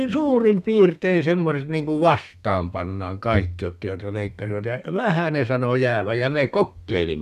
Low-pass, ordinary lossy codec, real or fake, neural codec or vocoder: 14.4 kHz; none; fake; codec, 32 kHz, 1.9 kbps, SNAC